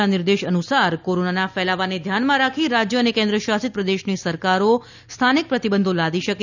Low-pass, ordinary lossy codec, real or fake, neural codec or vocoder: 7.2 kHz; none; real; none